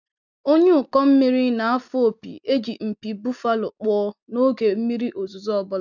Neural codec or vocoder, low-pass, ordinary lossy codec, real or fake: none; 7.2 kHz; none; real